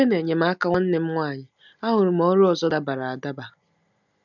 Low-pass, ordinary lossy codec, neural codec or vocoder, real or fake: 7.2 kHz; none; none; real